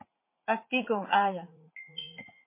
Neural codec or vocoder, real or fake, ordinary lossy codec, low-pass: vocoder, 44.1 kHz, 80 mel bands, Vocos; fake; MP3, 24 kbps; 3.6 kHz